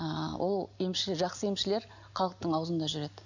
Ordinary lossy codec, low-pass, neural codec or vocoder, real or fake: none; 7.2 kHz; none; real